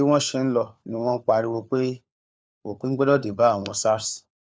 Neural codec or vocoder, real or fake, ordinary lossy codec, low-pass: codec, 16 kHz, 4 kbps, FunCodec, trained on LibriTTS, 50 frames a second; fake; none; none